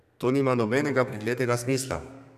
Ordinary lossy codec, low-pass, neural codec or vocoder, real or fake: none; 14.4 kHz; codec, 32 kHz, 1.9 kbps, SNAC; fake